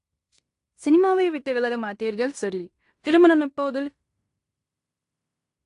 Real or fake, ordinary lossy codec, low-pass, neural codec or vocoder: fake; AAC, 48 kbps; 10.8 kHz; codec, 16 kHz in and 24 kHz out, 0.9 kbps, LongCat-Audio-Codec, fine tuned four codebook decoder